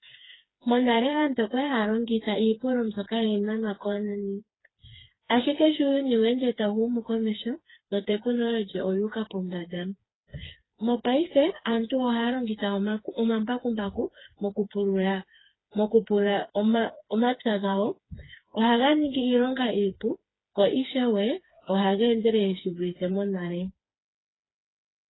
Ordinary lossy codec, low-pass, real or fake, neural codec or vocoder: AAC, 16 kbps; 7.2 kHz; fake; codec, 16 kHz, 4 kbps, FreqCodec, smaller model